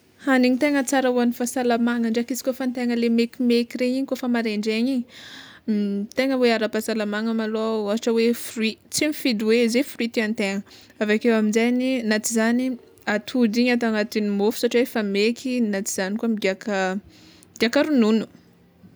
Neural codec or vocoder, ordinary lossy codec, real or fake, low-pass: none; none; real; none